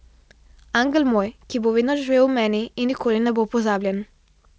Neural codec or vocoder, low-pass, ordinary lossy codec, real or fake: none; none; none; real